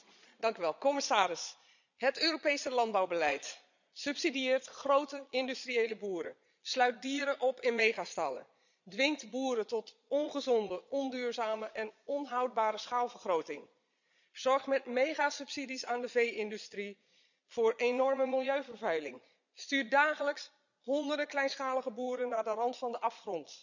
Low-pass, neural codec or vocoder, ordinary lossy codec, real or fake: 7.2 kHz; vocoder, 22.05 kHz, 80 mel bands, Vocos; none; fake